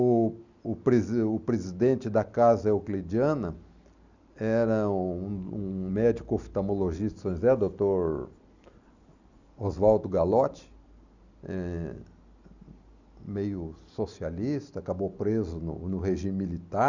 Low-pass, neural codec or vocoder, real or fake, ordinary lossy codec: 7.2 kHz; none; real; none